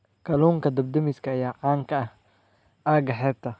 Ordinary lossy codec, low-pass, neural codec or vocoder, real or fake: none; none; none; real